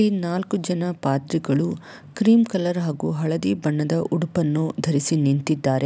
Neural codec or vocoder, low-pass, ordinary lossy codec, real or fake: none; none; none; real